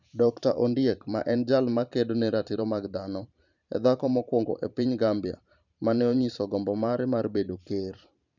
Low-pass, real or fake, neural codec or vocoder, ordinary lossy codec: 7.2 kHz; fake; vocoder, 44.1 kHz, 128 mel bands every 512 samples, BigVGAN v2; none